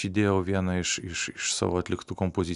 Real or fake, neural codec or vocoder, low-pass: real; none; 10.8 kHz